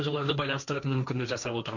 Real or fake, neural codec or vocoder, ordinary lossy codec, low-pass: fake; codec, 16 kHz, 1.1 kbps, Voila-Tokenizer; none; 7.2 kHz